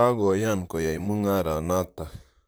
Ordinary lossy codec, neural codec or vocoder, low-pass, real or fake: none; vocoder, 44.1 kHz, 128 mel bands, Pupu-Vocoder; none; fake